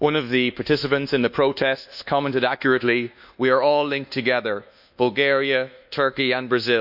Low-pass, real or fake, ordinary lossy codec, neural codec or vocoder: 5.4 kHz; fake; none; codec, 24 kHz, 1.2 kbps, DualCodec